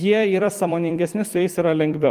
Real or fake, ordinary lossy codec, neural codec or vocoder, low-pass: fake; Opus, 24 kbps; vocoder, 44.1 kHz, 128 mel bands every 256 samples, BigVGAN v2; 14.4 kHz